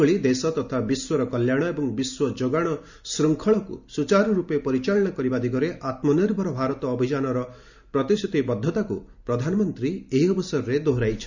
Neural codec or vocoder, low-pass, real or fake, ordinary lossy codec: none; 7.2 kHz; real; none